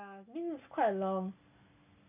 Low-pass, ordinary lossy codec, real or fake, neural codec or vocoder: 3.6 kHz; MP3, 32 kbps; real; none